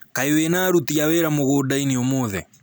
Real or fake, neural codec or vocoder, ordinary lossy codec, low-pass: real; none; none; none